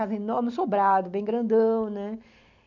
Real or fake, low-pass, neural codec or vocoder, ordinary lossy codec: real; 7.2 kHz; none; none